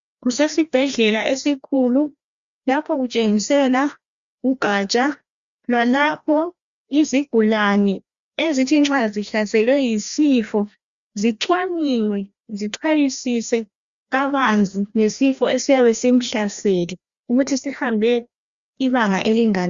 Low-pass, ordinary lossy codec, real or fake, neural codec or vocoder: 7.2 kHz; Opus, 64 kbps; fake; codec, 16 kHz, 1 kbps, FreqCodec, larger model